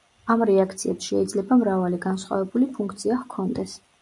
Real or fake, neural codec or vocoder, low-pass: real; none; 10.8 kHz